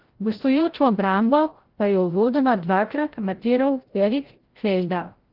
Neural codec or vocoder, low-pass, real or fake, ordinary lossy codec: codec, 16 kHz, 0.5 kbps, FreqCodec, larger model; 5.4 kHz; fake; Opus, 16 kbps